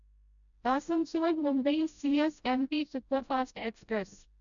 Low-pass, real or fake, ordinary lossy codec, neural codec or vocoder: 7.2 kHz; fake; none; codec, 16 kHz, 0.5 kbps, FreqCodec, smaller model